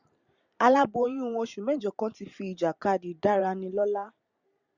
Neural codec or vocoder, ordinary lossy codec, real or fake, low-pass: vocoder, 44.1 kHz, 128 mel bands every 512 samples, BigVGAN v2; Opus, 64 kbps; fake; 7.2 kHz